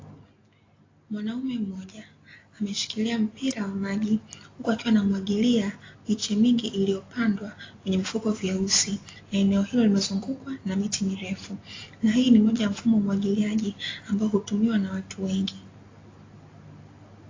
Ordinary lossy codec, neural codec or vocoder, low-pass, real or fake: AAC, 32 kbps; none; 7.2 kHz; real